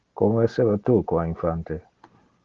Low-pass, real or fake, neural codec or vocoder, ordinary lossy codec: 7.2 kHz; real; none; Opus, 16 kbps